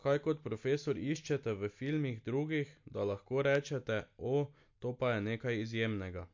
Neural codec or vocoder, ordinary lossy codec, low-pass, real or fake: none; MP3, 48 kbps; 7.2 kHz; real